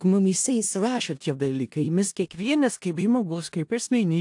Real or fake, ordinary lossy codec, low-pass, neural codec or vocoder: fake; AAC, 64 kbps; 10.8 kHz; codec, 16 kHz in and 24 kHz out, 0.4 kbps, LongCat-Audio-Codec, four codebook decoder